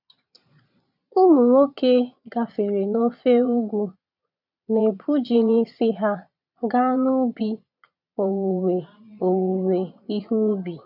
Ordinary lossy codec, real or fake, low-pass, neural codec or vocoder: none; fake; 5.4 kHz; vocoder, 22.05 kHz, 80 mel bands, Vocos